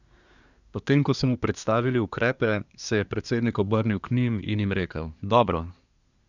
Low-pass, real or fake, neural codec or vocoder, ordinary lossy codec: 7.2 kHz; fake; codec, 24 kHz, 1 kbps, SNAC; none